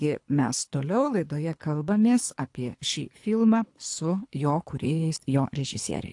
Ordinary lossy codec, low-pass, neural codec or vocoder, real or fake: AAC, 64 kbps; 10.8 kHz; codec, 24 kHz, 3 kbps, HILCodec; fake